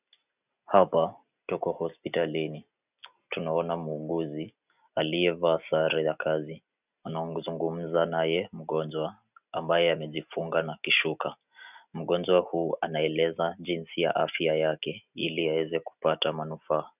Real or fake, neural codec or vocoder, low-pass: real; none; 3.6 kHz